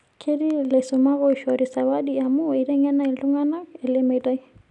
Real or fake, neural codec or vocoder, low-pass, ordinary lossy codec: real; none; 10.8 kHz; none